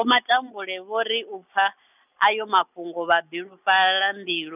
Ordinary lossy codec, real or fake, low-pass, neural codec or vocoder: none; real; 3.6 kHz; none